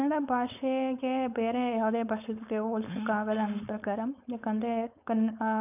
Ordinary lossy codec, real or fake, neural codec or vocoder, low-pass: none; fake; codec, 16 kHz, 4.8 kbps, FACodec; 3.6 kHz